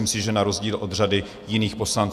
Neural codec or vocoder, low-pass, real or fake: vocoder, 48 kHz, 128 mel bands, Vocos; 14.4 kHz; fake